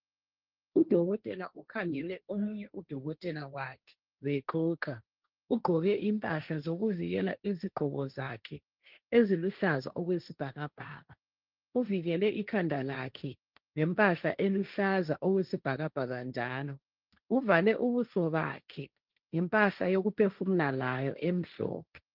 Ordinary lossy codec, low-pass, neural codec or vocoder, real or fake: Opus, 32 kbps; 5.4 kHz; codec, 16 kHz, 1.1 kbps, Voila-Tokenizer; fake